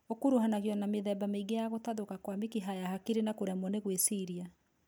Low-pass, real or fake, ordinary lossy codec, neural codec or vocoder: none; real; none; none